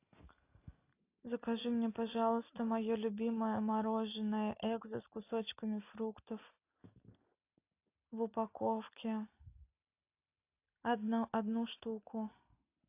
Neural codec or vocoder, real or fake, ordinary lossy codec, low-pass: none; real; AAC, 24 kbps; 3.6 kHz